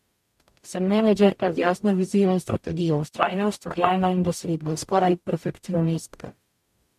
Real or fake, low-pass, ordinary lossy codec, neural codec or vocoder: fake; 14.4 kHz; MP3, 64 kbps; codec, 44.1 kHz, 0.9 kbps, DAC